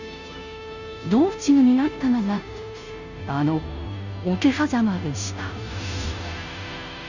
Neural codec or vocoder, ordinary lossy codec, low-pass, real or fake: codec, 16 kHz, 0.5 kbps, FunCodec, trained on Chinese and English, 25 frames a second; none; 7.2 kHz; fake